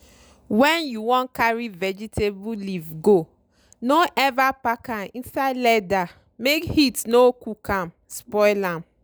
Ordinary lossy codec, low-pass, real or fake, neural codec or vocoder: none; none; real; none